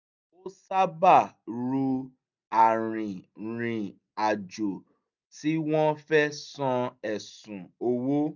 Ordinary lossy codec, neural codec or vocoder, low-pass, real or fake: none; none; 7.2 kHz; real